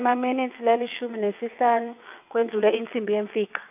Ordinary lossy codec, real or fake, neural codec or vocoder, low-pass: AAC, 32 kbps; fake; vocoder, 22.05 kHz, 80 mel bands, WaveNeXt; 3.6 kHz